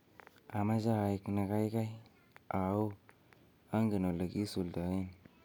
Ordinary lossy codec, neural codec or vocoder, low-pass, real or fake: none; none; none; real